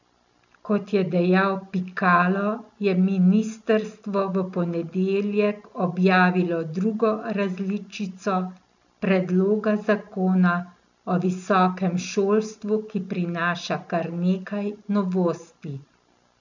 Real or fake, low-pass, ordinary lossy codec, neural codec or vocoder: real; 7.2 kHz; none; none